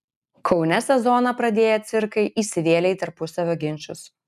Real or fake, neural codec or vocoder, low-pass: real; none; 14.4 kHz